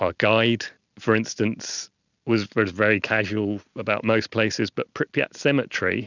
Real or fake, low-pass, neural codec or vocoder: real; 7.2 kHz; none